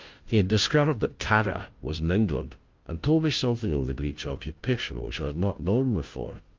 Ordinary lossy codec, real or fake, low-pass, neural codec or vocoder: Opus, 32 kbps; fake; 7.2 kHz; codec, 16 kHz, 0.5 kbps, FunCodec, trained on Chinese and English, 25 frames a second